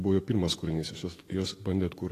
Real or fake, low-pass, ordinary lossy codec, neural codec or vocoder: fake; 14.4 kHz; AAC, 48 kbps; autoencoder, 48 kHz, 128 numbers a frame, DAC-VAE, trained on Japanese speech